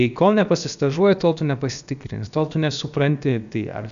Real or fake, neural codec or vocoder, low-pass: fake; codec, 16 kHz, about 1 kbps, DyCAST, with the encoder's durations; 7.2 kHz